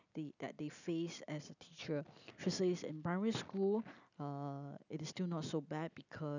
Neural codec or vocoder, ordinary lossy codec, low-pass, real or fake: none; none; 7.2 kHz; real